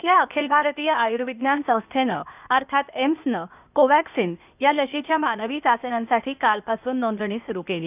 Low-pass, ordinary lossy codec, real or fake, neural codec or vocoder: 3.6 kHz; none; fake; codec, 16 kHz, 0.8 kbps, ZipCodec